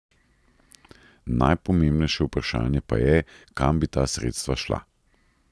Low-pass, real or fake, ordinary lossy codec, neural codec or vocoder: none; real; none; none